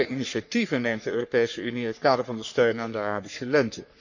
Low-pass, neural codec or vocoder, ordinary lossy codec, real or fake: 7.2 kHz; codec, 44.1 kHz, 3.4 kbps, Pupu-Codec; none; fake